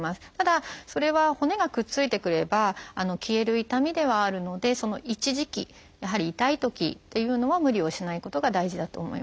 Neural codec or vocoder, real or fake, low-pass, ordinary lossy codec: none; real; none; none